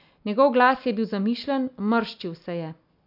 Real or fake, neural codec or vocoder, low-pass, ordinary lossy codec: real; none; 5.4 kHz; none